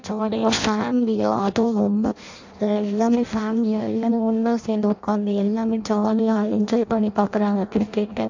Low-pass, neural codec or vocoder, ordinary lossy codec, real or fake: 7.2 kHz; codec, 16 kHz in and 24 kHz out, 0.6 kbps, FireRedTTS-2 codec; AAC, 48 kbps; fake